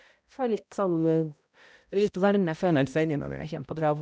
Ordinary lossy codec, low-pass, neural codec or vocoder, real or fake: none; none; codec, 16 kHz, 0.5 kbps, X-Codec, HuBERT features, trained on balanced general audio; fake